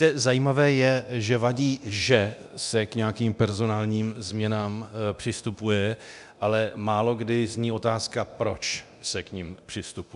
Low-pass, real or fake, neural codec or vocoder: 10.8 kHz; fake; codec, 24 kHz, 0.9 kbps, DualCodec